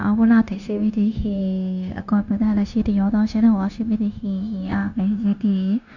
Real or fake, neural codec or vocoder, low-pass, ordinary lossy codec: fake; codec, 16 kHz, 0.9 kbps, LongCat-Audio-Codec; 7.2 kHz; none